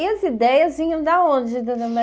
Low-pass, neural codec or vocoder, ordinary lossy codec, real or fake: none; none; none; real